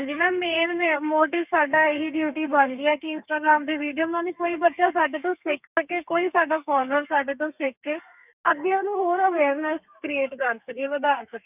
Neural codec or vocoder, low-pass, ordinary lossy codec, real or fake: codec, 32 kHz, 1.9 kbps, SNAC; 3.6 kHz; none; fake